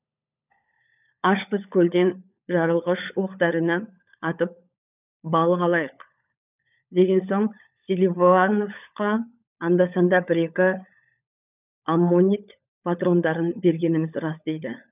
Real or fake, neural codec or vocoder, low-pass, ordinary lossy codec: fake; codec, 16 kHz, 16 kbps, FunCodec, trained on LibriTTS, 50 frames a second; 3.6 kHz; none